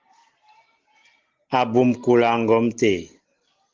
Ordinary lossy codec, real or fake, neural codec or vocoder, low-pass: Opus, 16 kbps; real; none; 7.2 kHz